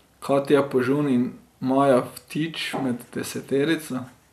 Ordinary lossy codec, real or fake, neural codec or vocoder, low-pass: none; real; none; 14.4 kHz